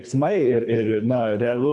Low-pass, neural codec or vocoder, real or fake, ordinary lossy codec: 10.8 kHz; codec, 24 kHz, 3 kbps, HILCodec; fake; AAC, 64 kbps